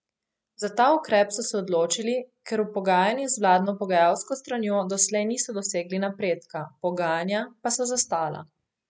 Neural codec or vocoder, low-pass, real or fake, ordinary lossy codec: none; none; real; none